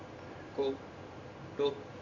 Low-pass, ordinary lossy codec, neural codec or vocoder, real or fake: 7.2 kHz; none; none; real